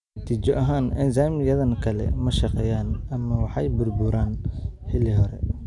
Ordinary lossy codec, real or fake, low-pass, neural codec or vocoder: none; real; 10.8 kHz; none